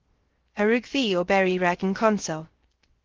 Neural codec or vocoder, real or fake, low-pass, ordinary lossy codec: codec, 16 kHz, 0.3 kbps, FocalCodec; fake; 7.2 kHz; Opus, 16 kbps